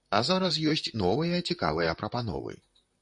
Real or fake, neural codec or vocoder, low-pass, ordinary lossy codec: fake; vocoder, 44.1 kHz, 128 mel bands, Pupu-Vocoder; 10.8 kHz; MP3, 48 kbps